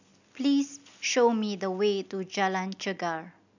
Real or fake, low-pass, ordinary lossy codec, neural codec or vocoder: real; 7.2 kHz; none; none